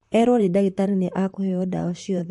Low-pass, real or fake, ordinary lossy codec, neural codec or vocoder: 14.4 kHz; fake; MP3, 48 kbps; vocoder, 44.1 kHz, 128 mel bands, Pupu-Vocoder